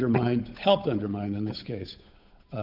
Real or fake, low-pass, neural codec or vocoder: fake; 5.4 kHz; codec, 16 kHz, 8 kbps, FunCodec, trained on Chinese and English, 25 frames a second